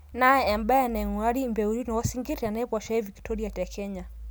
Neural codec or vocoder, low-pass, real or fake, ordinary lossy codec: none; none; real; none